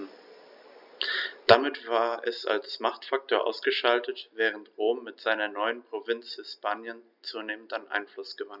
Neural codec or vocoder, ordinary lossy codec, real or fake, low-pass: none; none; real; 5.4 kHz